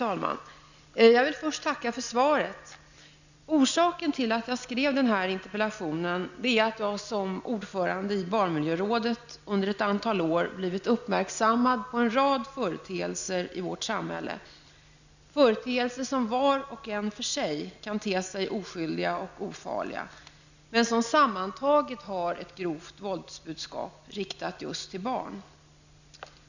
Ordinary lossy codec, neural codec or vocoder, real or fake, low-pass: none; none; real; 7.2 kHz